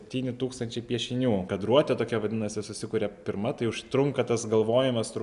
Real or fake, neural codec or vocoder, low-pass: real; none; 10.8 kHz